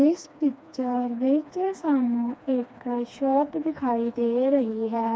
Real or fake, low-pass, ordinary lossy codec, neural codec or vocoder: fake; none; none; codec, 16 kHz, 2 kbps, FreqCodec, smaller model